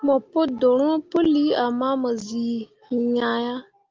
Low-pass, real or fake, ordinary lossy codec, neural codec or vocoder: 7.2 kHz; real; Opus, 24 kbps; none